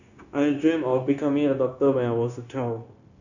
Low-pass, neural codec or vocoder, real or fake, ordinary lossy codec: 7.2 kHz; codec, 16 kHz, 0.9 kbps, LongCat-Audio-Codec; fake; none